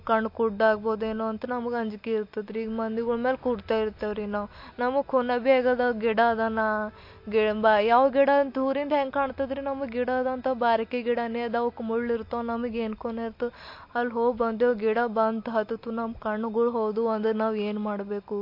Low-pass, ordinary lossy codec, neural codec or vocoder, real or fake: 5.4 kHz; MP3, 32 kbps; none; real